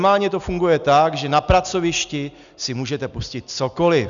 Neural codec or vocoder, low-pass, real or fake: none; 7.2 kHz; real